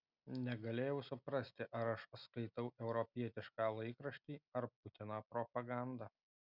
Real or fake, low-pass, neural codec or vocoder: real; 5.4 kHz; none